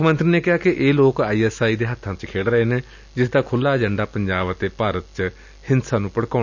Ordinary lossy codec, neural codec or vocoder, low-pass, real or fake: none; none; 7.2 kHz; real